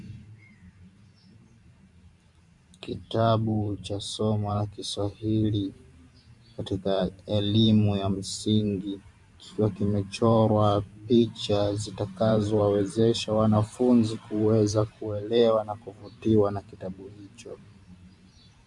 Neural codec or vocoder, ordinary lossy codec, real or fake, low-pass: vocoder, 44.1 kHz, 128 mel bands every 512 samples, BigVGAN v2; MP3, 64 kbps; fake; 10.8 kHz